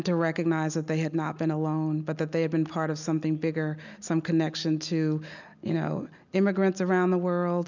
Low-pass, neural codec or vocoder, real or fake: 7.2 kHz; none; real